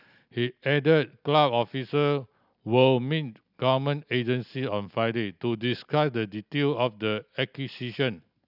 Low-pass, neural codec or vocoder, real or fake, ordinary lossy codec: 5.4 kHz; none; real; none